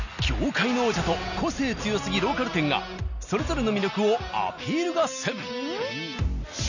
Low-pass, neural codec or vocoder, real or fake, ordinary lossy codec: 7.2 kHz; none; real; none